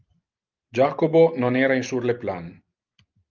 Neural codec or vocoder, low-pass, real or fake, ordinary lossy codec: none; 7.2 kHz; real; Opus, 24 kbps